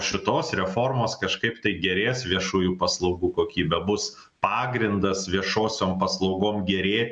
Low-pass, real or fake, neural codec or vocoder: 9.9 kHz; real; none